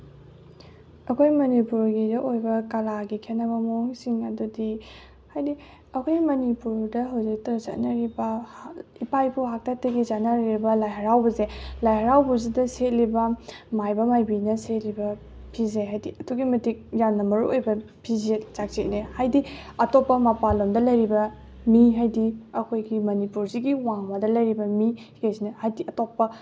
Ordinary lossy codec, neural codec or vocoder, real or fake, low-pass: none; none; real; none